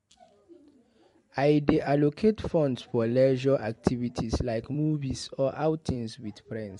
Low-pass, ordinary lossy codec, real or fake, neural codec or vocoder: 14.4 kHz; MP3, 48 kbps; fake; vocoder, 44.1 kHz, 128 mel bands every 256 samples, BigVGAN v2